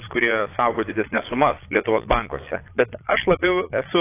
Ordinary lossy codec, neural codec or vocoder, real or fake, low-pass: AAC, 24 kbps; vocoder, 22.05 kHz, 80 mel bands, Vocos; fake; 3.6 kHz